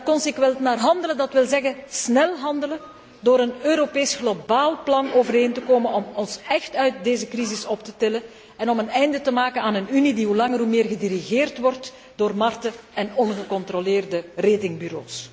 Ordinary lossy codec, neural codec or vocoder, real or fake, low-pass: none; none; real; none